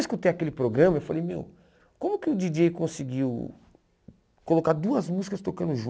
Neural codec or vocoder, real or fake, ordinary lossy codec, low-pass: none; real; none; none